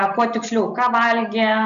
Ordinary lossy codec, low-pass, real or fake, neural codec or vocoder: AAC, 96 kbps; 7.2 kHz; real; none